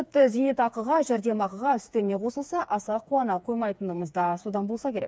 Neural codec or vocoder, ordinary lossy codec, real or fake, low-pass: codec, 16 kHz, 4 kbps, FreqCodec, smaller model; none; fake; none